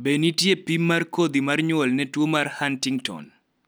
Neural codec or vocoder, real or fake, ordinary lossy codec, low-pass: none; real; none; none